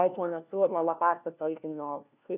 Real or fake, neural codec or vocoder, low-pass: fake; codec, 16 kHz, 1 kbps, FunCodec, trained on LibriTTS, 50 frames a second; 3.6 kHz